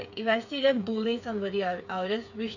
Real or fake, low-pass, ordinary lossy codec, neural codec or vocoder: fake; 7.2 kHz; none; codec, 16 kHz, 8 kbps, FreqCodec, smaller model